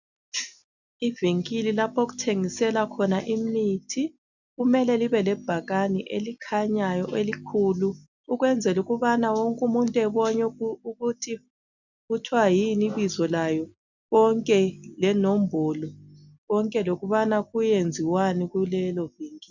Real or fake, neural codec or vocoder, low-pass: real; none; 7.2 kHz